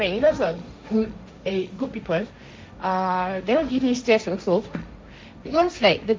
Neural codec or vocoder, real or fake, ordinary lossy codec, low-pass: codec, 16 kHz, 1.1 kbps, Voila-Tokenizer; fake; none; none